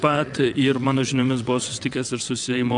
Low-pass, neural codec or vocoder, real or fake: 9.9 kHz; vocoder, 22.05 kHz, 80 mel bands, WaveNeXt; fake